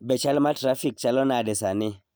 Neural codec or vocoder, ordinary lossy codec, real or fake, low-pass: none; none; real; none